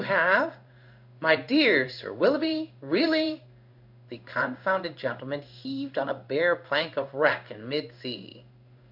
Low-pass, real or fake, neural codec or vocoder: 5.4 kHz; fake; codec, 16 kHz in and 24 kHz out, 1 kbps, XY-Tokenizer